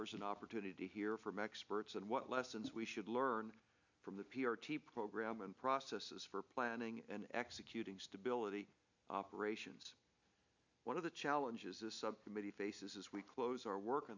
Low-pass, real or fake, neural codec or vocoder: 7.2 kHz; real; none